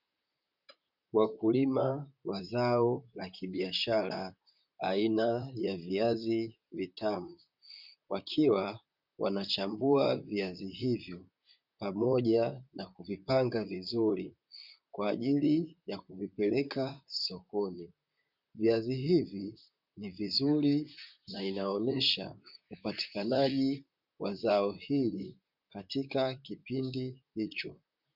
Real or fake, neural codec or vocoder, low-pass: fake; vocoder, 44.1 kHz, 128 mel bands, Pupu-Vocoder; 5.4 kHz